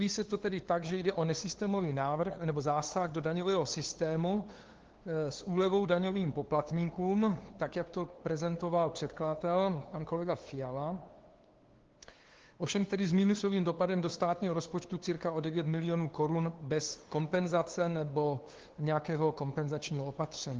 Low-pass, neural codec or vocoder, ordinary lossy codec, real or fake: 7.2 kHz; codec, 16 kHz, 2 kbps, FunCodec, trained on LibriTTS, 25 frames a second; Opus, 16 kbps; fake